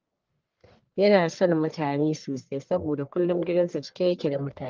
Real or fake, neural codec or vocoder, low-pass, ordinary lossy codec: fake; codec, 44.1 kHz, 1.7 kbps, Pupu-Codec; 7.2 kHz; Opus, 32 kbps